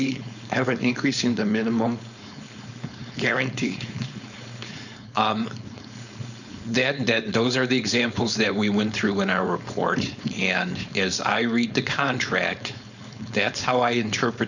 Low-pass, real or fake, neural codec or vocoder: 7.2 kHz; fake; codec, 16 kHz, 4.8 kbps, FACodec